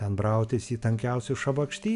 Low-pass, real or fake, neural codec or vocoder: 10.8 kHz; real; none